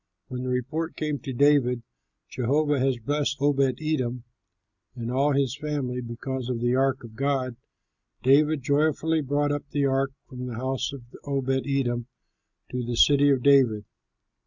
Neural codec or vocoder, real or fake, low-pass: none; real; 7.2 kHz